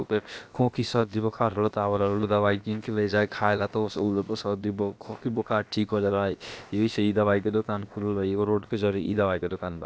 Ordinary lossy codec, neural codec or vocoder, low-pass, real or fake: none; codec, 16 kHz, about 1 kbps, DyCAST, with the encoder's durations; none; fake